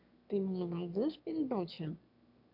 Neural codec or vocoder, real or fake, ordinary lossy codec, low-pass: autoencoder, 22.05 kHz, a latent of 192 numbers a frame, VITS, trained on one speaker; fake; AAC, 48 kbps; 5.4 kHz